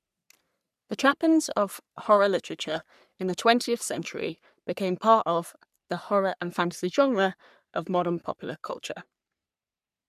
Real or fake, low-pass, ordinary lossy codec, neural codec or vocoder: fake; 14.4 kHz; none; codec, 44.1 kHz, 3.4 kbps, Pupu-Codec